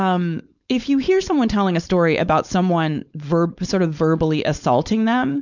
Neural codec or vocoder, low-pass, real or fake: codec, 16 kHz, 4.8 kbps, FACodec; 7.2 kHz; fake